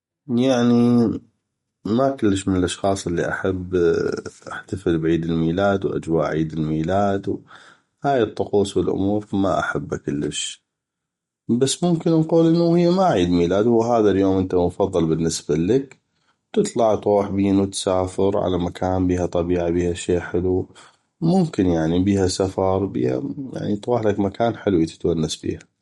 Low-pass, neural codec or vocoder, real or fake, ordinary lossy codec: 19.8 kHz; none; real; MP3, 48 kbps